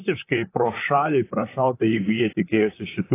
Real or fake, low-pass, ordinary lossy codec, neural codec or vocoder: fake; 3.6 kHz; AAC, 24 kbps; vocoder, 44.1 kHz, 128 mel bands, Pupu-Vocoder